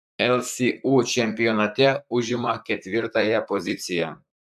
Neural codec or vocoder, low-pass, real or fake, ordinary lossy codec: vocoder, 44.1 kHz, 128 mel bands, Pupu-Vocoder; 14.4 kHz; fake; AAC, 96 kbps